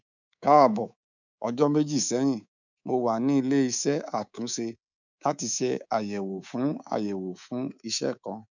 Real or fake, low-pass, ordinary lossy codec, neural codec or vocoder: fake; 7.2 kHz; none; codec, 24 kHz, 3.1 kbps, DualCodec